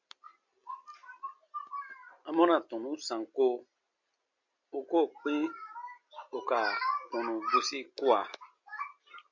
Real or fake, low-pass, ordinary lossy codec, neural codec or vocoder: real; 7.2 kHz; MP3, 48 kbps; none